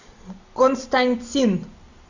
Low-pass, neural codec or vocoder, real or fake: 7.2 kHz; none; real